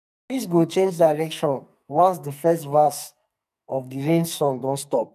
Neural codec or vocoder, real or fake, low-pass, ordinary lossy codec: codec, 44.1 kHz, 2.6 kbps, SNAC; fake; 14.4 kHz; none